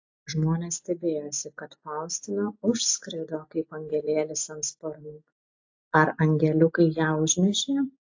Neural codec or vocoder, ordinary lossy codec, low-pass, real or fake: none; AAC, 48 kbps; 7.2 kHz; real